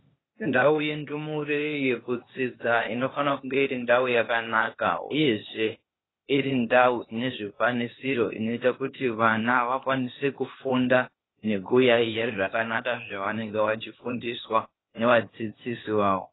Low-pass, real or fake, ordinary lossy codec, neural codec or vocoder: 7.2 kHz; fake; AAC, 16 kbps; codec, 16 kHz, 0.8 kbps, ZipCodec